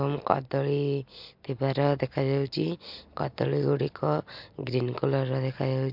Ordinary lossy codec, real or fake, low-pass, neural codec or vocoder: MP3, 48 kbps; real; 5.4 kHz; none